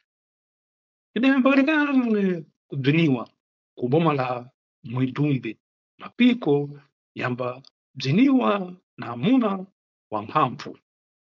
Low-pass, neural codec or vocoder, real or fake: 7.2 kHz; codec, 16 kHz, 4.8 kbps, FACodec; fake